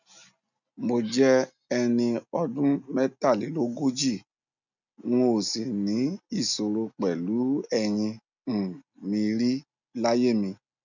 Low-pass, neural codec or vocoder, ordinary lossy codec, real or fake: 7.2 kHz; none; none; real